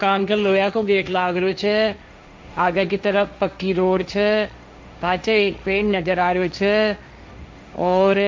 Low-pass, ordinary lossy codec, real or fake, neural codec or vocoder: none; none; fake; codec, 16 kHz, 1.1 kbps, Voila-Tokenizer